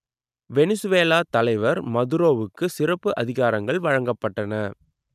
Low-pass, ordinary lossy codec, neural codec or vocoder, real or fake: 14.4 kHz; none; none; real